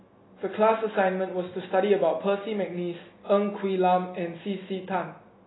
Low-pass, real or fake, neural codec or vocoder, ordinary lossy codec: 7.2 kHz; real; none; AAC, 16 kbps